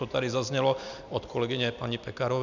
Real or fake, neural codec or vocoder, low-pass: real; none; 7.2 kHz